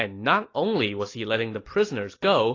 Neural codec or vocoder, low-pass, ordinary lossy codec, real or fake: none; 7.2 kHz; AAC, 32 kbps; real